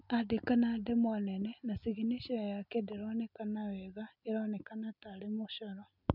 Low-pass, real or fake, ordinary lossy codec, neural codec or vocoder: 5.4 kHz; real; none; none